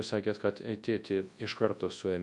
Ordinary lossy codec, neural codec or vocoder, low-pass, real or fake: AAC, 64 kbps; codec, 24 kHz, 0.9 kbps, WavTokenizer, large speech release; 10.8 kHz; fake